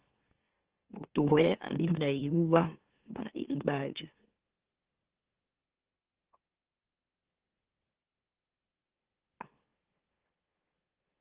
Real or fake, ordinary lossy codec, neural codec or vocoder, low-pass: fake; Opus, 16 kbps; autoencoder, 44.1 kHz, a latent of 192 numbers a frame, MeloTTS; 3.6 kHz